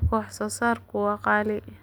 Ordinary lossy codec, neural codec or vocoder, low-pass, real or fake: none; none; none; real